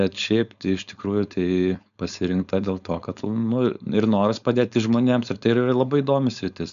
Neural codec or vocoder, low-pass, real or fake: codec, 16 kHz, 4.8 kbps, FACodec; 7.2 kHz; fake